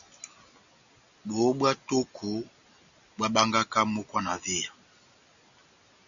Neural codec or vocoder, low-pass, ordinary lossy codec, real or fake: none; 7.2 kHz; MP3, 96 kbps; real